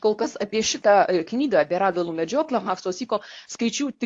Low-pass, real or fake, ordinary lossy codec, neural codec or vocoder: 10.8 kHz; fake; AAC, 48 kbps; codec, 24 kHz, 0.9 kbps, WavTokenizer, medium speech release version 2